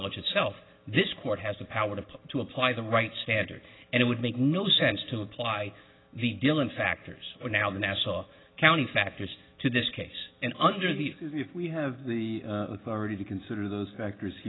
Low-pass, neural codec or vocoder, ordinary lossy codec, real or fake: 7.2 kHz; none; AAC, 16 kbps; real